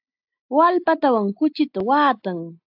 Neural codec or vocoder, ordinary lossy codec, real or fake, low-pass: none; AAC, 48 kbps; real; 5.4 kHz